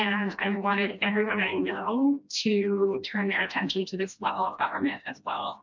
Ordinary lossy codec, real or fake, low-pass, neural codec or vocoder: MP3, 64 kbps; fake; 7.2 kHz; codec, 16 kHz, 1 kbps, FreqCodec, smaller model